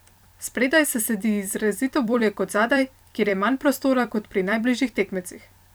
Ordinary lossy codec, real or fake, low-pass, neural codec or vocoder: none; fake; none; vocoder, 44.1 kHz, 128 mel bands every 256 samples, BigVGAN v2